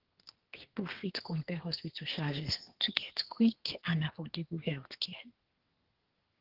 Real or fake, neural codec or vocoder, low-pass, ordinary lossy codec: fake; codec, 32 kHz, 1.9 kbps, SNAC; 5.4 kHz; Opus, 16 kbps